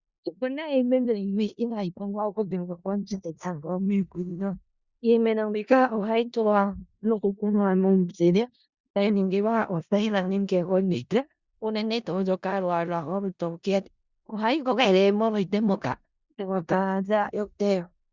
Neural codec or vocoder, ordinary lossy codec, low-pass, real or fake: codec, 16 kHz in and 24 kHz out, 0.4 kbps, LongCat-Audio-Codec, four codebook decoder; Opus, 64 kbps; 7.2 kHz; fake